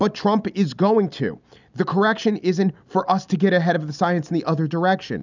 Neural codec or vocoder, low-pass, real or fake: none; 7.2 kHz; real